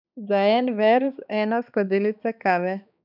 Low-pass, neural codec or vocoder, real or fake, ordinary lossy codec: 5.4 kHz; codec, 16 kHz, 2 kbps, X-Codec, HuBERT features, trained on balanced general audio; fake; none